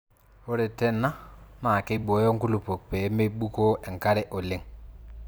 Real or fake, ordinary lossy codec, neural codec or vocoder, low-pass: fake; none; vocoder, 44.1 kHz, 128 mel bands every 256 samples, BigVGAN v2; none